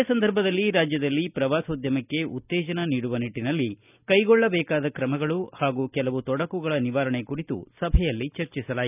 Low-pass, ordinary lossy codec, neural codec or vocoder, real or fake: 3.6 kHz; none; none; real